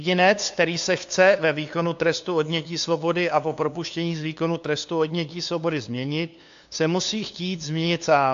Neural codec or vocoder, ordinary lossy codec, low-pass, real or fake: codec, 16 kHz, 2 kbps, FunCodec, trained on LibriTTS, 25 frames a second; AAC, 64 kbps; 7.2 kHz; fake